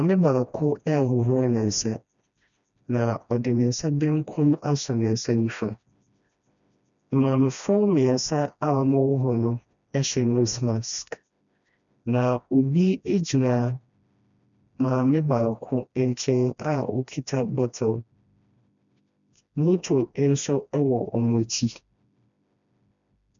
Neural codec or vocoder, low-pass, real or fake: codec, 16 kHz, 1 kbps, FreqCodec, smaller model; 7.2 kHz; fake